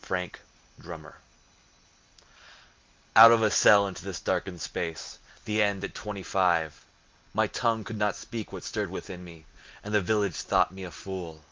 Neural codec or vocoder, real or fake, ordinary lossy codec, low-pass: none; real; Opus, 24 kbps; 7.2 kHz